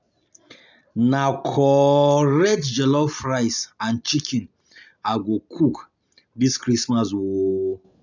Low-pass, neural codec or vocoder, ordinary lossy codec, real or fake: 7.2 kHz; none; none; real